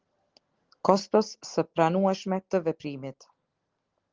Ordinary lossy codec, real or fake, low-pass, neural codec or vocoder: Opus, 16 kbps; real; 7.2 kHz; none